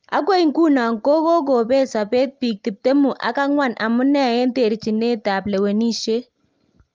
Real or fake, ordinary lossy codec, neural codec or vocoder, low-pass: real; Opus, 32 kbps; none; 7.2 kHz